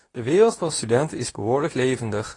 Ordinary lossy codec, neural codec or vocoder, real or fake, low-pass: AAC, 32 kbps; codec, 24 kHz, 0.9 kbps, WavTokenizer, medium speech release version 2; fake; 10.8 kHz